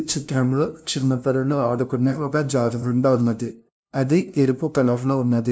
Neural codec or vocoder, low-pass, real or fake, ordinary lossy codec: codec, 16 kHz, 0.5 kbps, FunCodec, trained on LibriTTS, 25 frames a second; none; fake; none